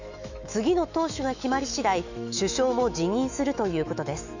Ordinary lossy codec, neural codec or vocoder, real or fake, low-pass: none; autoencoder, 48 kHz, 128 numbers a frame, DAC-VAE, trained on Japanese speech; fake; 7.2 kHz